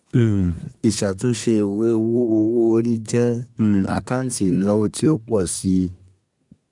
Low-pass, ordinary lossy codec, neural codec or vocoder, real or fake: 10.8 kHz; none; codec, 24 kHz, 1 kbps, SNAC; fake